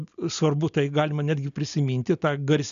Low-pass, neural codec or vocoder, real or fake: 7.2 kHz; none; real